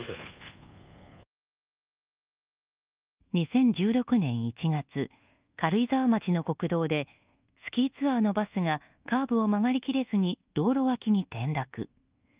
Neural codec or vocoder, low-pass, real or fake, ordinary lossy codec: codec, 24 kHz, 1.2 kbps, DualCodec; 3.6 kHz; fake; Opus, 32 kbps